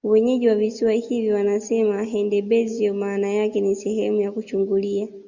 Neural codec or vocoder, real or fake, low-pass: none; real; 7.2 kHz